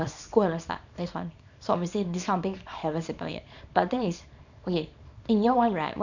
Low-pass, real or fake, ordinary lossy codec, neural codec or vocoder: 7.2 kHz; fake; none; codec, 24 kHz, 0.9 kbps, WavTokenizer, small release